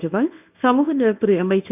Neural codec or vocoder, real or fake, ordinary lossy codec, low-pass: codec, 24 kHz, 0.9 kbps, WavTokenizer, medium speech release version 1; fake; none; 3.6 kHz